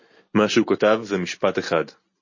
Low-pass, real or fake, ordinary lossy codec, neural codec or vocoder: 7.2 kHz; real; MP3, 32 kbps; none